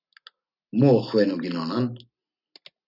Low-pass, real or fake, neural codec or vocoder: 5.4 kHz; real; none